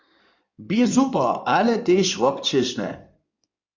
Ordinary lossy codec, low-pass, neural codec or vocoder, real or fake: Opus, 64 kbps; 7.2 kHz; codec, 16 kHz in and 24 kHz out, 2.2 kbps, FireRedTTS-2 codec; fake